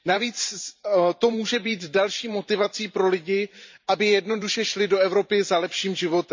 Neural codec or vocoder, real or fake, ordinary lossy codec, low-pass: vocoder, 44.1 kHz, 128 mel bands every 512 samples, BigVGAN v2; fake; MP3, 64 kbps; 7.2 kHz